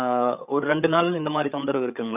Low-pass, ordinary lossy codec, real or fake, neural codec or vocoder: 3.6 kHz; none; fake; codec, 16 kHz, 8 kbps, FreqCodec, larger model